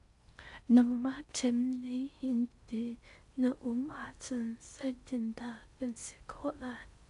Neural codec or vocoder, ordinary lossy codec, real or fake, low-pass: codec, 16 kHz in and 24 kHz out, 0.6 kbps, FocalCodec, streaming, 4096 codes; MP3, 64 kbps; fake; 10.8 kHz